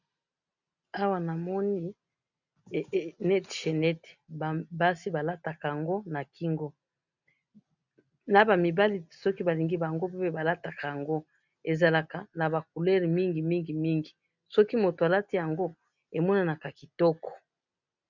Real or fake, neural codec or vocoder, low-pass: real; none; 7.2 kHz